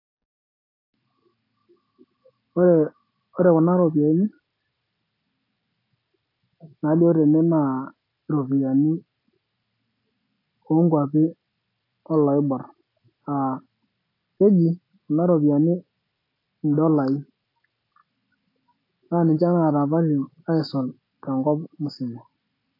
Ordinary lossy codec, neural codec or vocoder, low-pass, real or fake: AAC, 32 kbps; none; 5.4 kHz; real